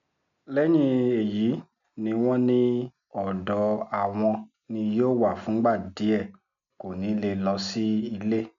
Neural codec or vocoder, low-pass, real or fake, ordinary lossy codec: none; 7.2 kHz; real; MP3, 96 kbps